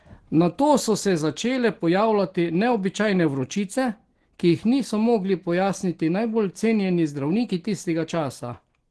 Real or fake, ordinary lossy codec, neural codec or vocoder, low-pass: real; Opus, 16 kbps; none; 10.8 kHz